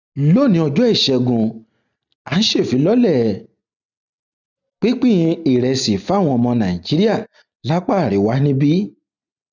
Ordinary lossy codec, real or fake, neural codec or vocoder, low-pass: none; real; none; 7.2 kHz